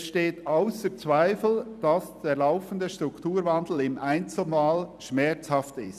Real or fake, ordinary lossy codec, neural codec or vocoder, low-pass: fake; none; vocoder, 44.1 kHz, 128 mel bands every 256 samples, BigVGAN v2; 14.4 kHz